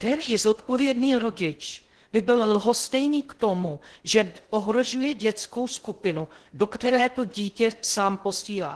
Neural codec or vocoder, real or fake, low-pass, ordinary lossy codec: codec, 16 kHz in and 24 kHz out, 0.6 kbps, FocalCodec, streaming, 2048 codes; fake; 10.8 kHz; Opus, 16 kbps